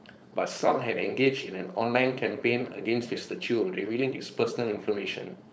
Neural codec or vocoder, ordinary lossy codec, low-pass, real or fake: codec, 16 kHz, 4.8 kbps, FACodec; none; none; fake